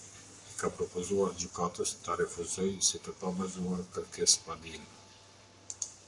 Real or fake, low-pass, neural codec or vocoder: fake; 10.8 kHz; codec, 44.1 kHz, 7.8 kbps, Pupu-Codec